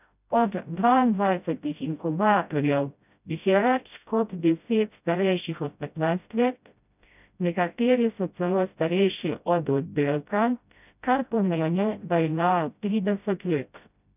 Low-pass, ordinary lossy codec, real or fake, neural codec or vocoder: 3.6 kHz; none; fake; codec, 16 kHz, 0.5 kbps, FreqCodec, smaller model